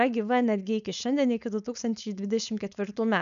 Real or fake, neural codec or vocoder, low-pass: fake; codec, 16 kHz, 4.8 kbps, FACodec; 7.2 kHz